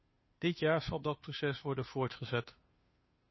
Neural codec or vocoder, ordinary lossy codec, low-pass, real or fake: codec, 16 kHz, 2 kbps, FunCodec, trained on Chinese and English, 25 frames a second; MP3, 24 kbps; 7.2 kHz; fake